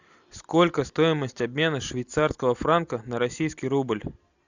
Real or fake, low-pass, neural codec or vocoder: real; 7.2 kHz; none